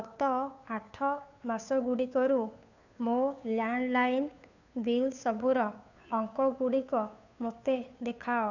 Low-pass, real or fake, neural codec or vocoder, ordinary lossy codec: 7.2 kHz; fake; codec, 16 kHz, 2 kbps, FunCodec, trained on Chinese and English, 25 frames a second; none